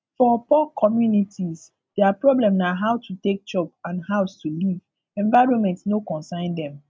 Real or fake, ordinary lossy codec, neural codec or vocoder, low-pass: real; none; none; none